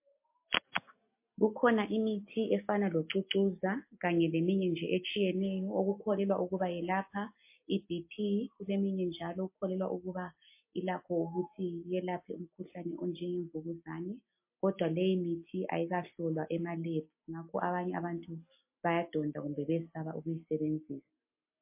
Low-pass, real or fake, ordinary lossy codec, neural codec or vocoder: 3.6 kHz; real; MP3, 24 kbps; none